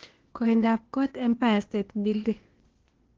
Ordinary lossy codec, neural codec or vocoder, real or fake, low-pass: Opus, 16 kbps; codec, 16 kHz, 0.8 kbps, ZipCodec; fake; 7.2 kHz